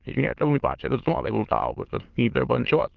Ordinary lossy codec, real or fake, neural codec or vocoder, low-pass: Opus, 16 kbps; fake; autoencoder, 22.05 kHz, a latent of 192 numbers a frame, VITS, trained on many speakers; 7.2 kHz